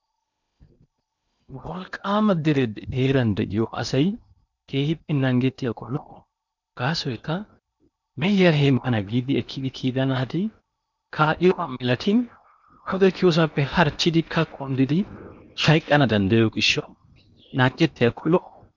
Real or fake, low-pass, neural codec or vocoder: fake; 7.2 kHz; codec, 16 kHz in and 24 kHz out, 0.8 kbps, FocalCodec, streaming, 65536 codes